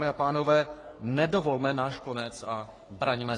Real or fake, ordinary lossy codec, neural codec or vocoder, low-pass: fake; AAC, 32 kbps; codec, 44.1 kHz, 3.4 kbps, Pupu-Codec; 10.8 kHz